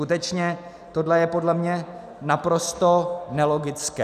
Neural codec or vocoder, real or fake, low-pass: none; real; 14.4 kHz